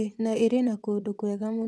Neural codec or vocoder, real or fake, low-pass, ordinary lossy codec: vocoder, 22.05 kHz, 80 mel bands, WaveNeXt; fake; none; none